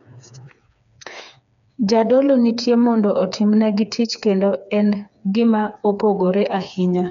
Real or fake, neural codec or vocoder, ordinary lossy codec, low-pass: fake; codec, 16 kHz, 4 kbps, FreqCodec, smaller model; none; 7.2 kHz